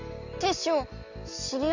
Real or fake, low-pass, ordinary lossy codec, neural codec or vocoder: real; 7.2 kHz; Opus, 64 kbps; none